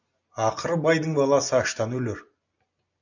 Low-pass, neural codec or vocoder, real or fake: 7.2 kHz; none; real